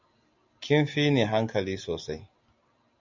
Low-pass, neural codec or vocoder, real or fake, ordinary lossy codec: 7.2 kHz; none; real; MP3, 64 kbps